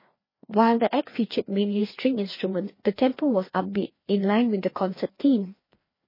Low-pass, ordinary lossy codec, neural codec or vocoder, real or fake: 5.4 kHz; MP3, 24 kbps; codec, 16 kHz, 2 kbps, FreqCodec, larger model; fake